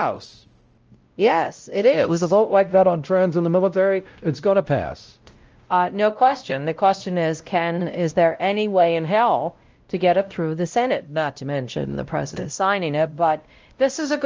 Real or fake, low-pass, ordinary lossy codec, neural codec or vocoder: fake; 7.2 kHz; Opus, 24 kbps; codec, 16 kHz, 0.5 kbps, X-Codec, WavLM features, trained on Multilingual LibriSpeech